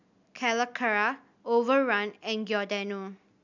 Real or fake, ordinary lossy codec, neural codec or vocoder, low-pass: real; none; none; 7.2 kHz